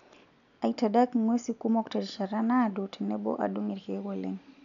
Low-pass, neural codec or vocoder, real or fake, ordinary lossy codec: 7.2 kHz; none; real; none